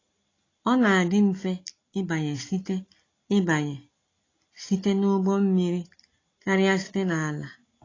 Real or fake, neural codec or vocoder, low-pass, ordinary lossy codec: real; none; 7.2 kHz; AAC, 32 kbps